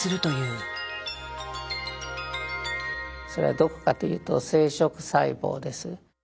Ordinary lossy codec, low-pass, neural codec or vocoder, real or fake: none; none; none; real